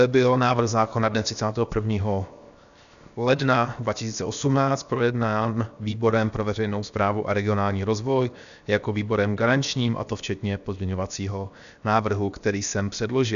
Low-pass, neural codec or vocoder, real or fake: 7.2 kHz; codec, 16 kHz, 0.7 kbps, FocalCodec; fake